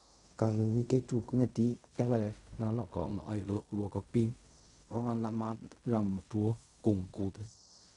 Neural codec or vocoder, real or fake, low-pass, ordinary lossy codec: codec, 16 kHz in and 24 kHz out, 0.4 kbps, LongCat-Audio-Codec, fine tuned four codebook decoder; fake; 10.8 kHz; none